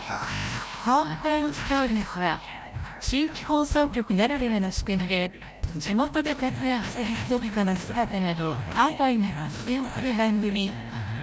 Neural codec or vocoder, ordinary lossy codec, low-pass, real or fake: codec, 16 kHz, 0.5 kbps, FreqCodec, larger model; none; none; fake